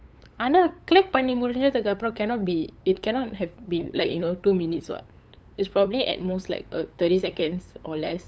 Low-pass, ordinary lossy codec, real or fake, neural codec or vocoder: none; none; fake; codec, 16 kHz, 8 kbps, FunCodec, trained on LibriTTS, 25 frames a second